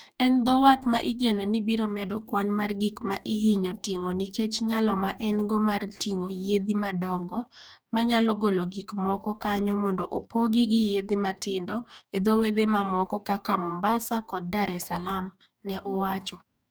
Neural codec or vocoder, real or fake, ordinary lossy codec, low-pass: codec, 44.1 kHz, 2.6 kbps, DAC; fake; none; none